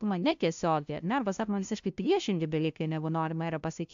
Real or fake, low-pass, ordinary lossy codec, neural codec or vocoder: fake; 7.2 kHz; AAC, 64 kbps; codec, 16 kHz, 0.5 kbps, FunCodec, trained on LibriTTS, 25 frames a second